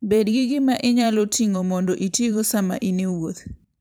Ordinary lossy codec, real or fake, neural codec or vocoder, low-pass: none; fake; vocoder, 44.1 kHz, 128 mel bands every 512 samples, BigVGAN v2; none